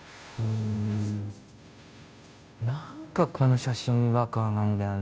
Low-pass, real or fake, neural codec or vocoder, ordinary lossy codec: none; fake; codec, 16 kHz, 0.5 kbps, FunCodec, trained on Chinese and English, 25 frames a second; none